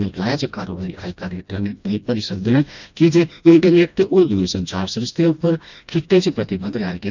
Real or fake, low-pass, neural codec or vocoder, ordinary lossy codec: fake; 7.2 kHz; codec, 16 kHz, 1 kbps, FreqCodec, smaller model; none